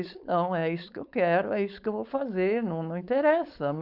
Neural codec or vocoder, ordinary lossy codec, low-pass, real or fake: codec, 16 kHz, 4.8 kbps, FACodec; none; 5.4 kHz; fake